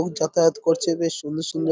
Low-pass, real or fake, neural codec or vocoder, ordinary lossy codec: none; real; none; none